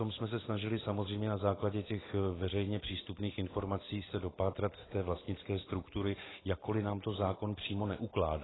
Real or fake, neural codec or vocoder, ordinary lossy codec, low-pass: real; none; AAC, 16 kbps; 7.2 kHz